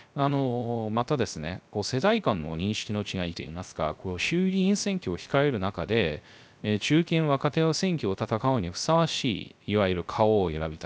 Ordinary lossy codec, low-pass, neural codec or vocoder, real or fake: none; none; codec, 16 kHz, 0.3 kbps, FocalCodec; fake